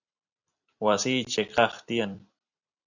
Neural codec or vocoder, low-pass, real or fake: vocoder, 44.1 kHz, 128 mel bands every 256 samples, BigVGAN v2; 7.2 kHz; fake